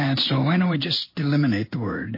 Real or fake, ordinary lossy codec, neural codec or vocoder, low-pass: fake; MP3, 24 kbps; codec, 16 kHz in and 24 kHz out, 1 kbps, XY-Tokenizer; 5.4 kHz